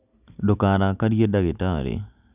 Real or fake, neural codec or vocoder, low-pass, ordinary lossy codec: real; none; 3.6 kHz; none